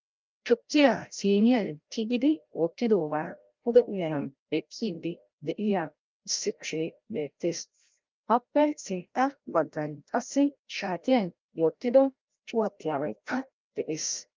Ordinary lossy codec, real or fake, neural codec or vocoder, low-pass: Opus, 32 kbps; fake; codec, 16 kHz, 0.5 kbps, FreqCodec, larger model; 7.2 kHz